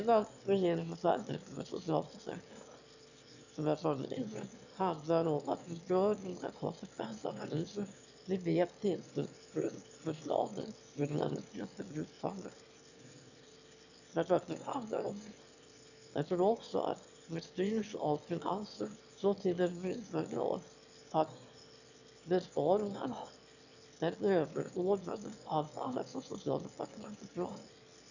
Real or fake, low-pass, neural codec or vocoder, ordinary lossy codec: fake; 7.2 kHz; autoencoder, 22.05 kHz, a latent of 192 numbers a frame, VITS, trained on one speaker; none